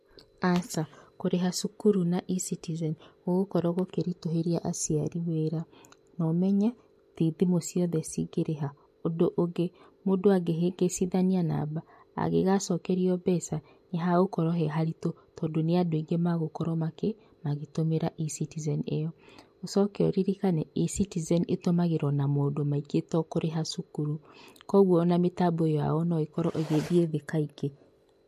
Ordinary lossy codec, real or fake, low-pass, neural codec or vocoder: MP3, 64 kbps; real; 14.4 kHz; none